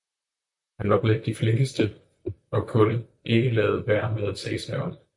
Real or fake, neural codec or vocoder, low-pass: fake; vocoder, 44.1 kHz, 128 mel bands, Pupu-Vocoder; 10.8 kHz